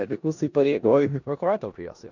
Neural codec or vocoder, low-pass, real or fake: codec, 16 kHz in and 24 kHz out, 0.4 kbps, LongCat-Audio-Codec, four codebook decoder; 7.2 kHz; fake